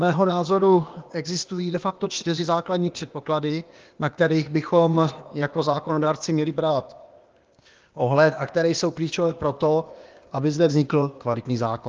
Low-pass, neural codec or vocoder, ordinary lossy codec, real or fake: 7.2 kHz; codec, 16 kHz, 0.8 kbps, ZipCodec; Opus, 24 kbps; fake